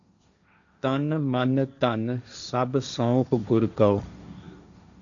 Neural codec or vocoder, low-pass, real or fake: codec, 16 kHz, 1.1 kbps, Voila-Tokenizer; 7.2 kHz; fake